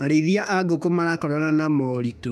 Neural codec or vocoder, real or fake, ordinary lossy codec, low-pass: autoencoder, 48 kHz, 32 numbers a frame, DAC-VAE, trained on Japanese speech; fake; none; 14.4 kHz